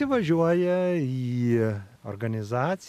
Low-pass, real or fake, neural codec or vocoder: 14.4 kHz; real; none